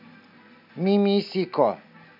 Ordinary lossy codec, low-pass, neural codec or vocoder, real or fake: MP3, 48 kbps; 5.4 kHz; none; real